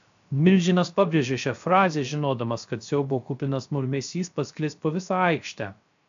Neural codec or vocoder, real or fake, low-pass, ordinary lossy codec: codec, 16 kHz, 0.3 kbps, FocalCodec; fake; 7.2 kHz; AAC, 64 kbps